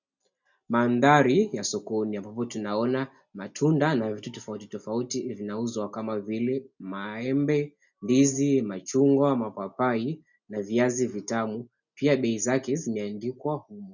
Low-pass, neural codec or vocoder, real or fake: 7.2 kHz; none; real